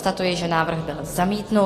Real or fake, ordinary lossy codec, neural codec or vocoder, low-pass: real; AAC, 48 kbps; none; 14.4 kHz